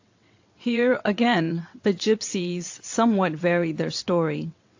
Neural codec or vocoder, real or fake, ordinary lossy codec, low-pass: vocoder, 22.05 kHz, 80 mel bands, WaveNeXt; fake; AAC, 48 kbps; 7.2 kHz